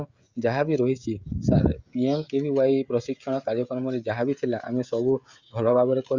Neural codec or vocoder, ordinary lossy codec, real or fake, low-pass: codec, 16 kHz, 16 kbps, FreqCodec, smaller model; Opus, 64 kbps; fake; 7.2 kHz